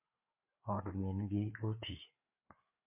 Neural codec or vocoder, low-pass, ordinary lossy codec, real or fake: codec, 16 kHz, 4 kbps, FreqCodec, larger model; 3.6 kHz; MP3, 32 kbps; fake